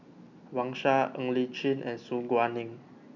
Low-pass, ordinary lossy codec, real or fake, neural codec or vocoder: 7.2 kHz; none; real; none